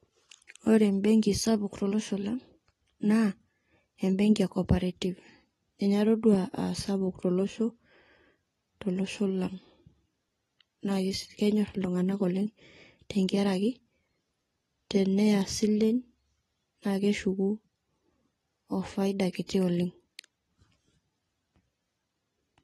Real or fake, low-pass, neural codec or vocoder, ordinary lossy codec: real; 19.8 kHz; none; AAC, 32 kbps